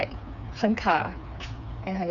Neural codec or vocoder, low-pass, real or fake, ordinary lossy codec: codec, 16 kHz, 4 kbps, FreqCodec, smaller model; 7.2 kHz; fake; none